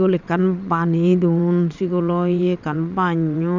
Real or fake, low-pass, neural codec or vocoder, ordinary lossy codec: fake; 7.2 kHz; vocoder, 22.05 kHz, 80 mel bands, WaveNeXt; none